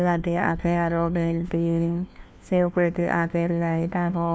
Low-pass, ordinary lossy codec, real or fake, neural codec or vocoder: none; none; fake; codec, 16 kHz, 1 kbps, FunCodec, trained on LibriTTS, 50 frames a second